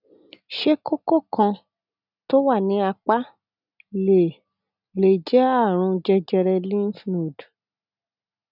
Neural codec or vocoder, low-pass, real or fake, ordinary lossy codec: none; 5.4 kHz; real; none